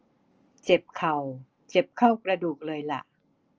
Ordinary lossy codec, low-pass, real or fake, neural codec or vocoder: Opus, 24 kbps; 7.2 kHz; real; none